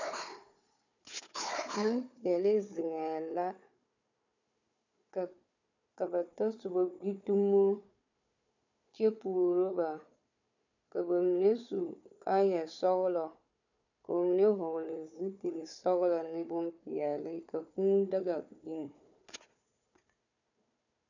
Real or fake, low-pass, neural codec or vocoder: fake; 7.2 kHz; codec, 16 kHz, 4 kbps, FunCodec, trained on Chinese and English, 50 frames a second